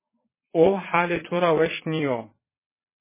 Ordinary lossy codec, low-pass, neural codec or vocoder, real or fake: MP3, 16 kbps; 3.6 kHz; vocoder, 22.05 kHz, 80 mel bands, Vocos; fake